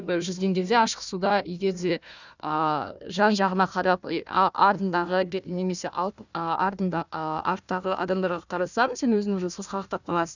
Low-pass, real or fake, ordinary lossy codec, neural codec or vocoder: 7.2 kHz; fake; none; codec, 16 kHz, 1 kbps, FunCodec, trained on Chinese and English, 50 frames a second